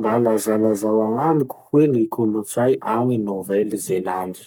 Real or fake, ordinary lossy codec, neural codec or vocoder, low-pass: fake; none; codec, 44.1 kHz, 3.4 kbps, Pupu-Codec; none